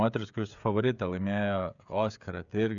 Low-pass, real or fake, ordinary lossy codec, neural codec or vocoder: 7.2 kHz; fake; MP3, 96 kbps; codec, 16 kHz, 16 kbps, FreqCodec, smaller model